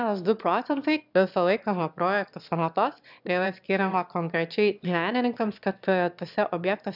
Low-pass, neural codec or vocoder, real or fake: 5.4 kHz; autoencoder, 22.05 kHz, a latent of 192 numbers a frame, VITS, trained on one speaker; fake